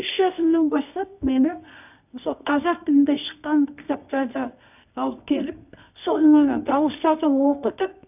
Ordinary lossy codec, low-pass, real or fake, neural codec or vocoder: none; 3.6 kHz; fake; codec, 24 kHz, 0.9 kbps, WavTokenizer, medium music audio release